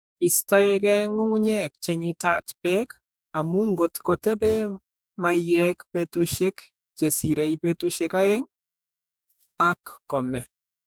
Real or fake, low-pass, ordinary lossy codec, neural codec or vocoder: fake; none; none; codec, 44.1 kHz, 2.6 kbps, DAC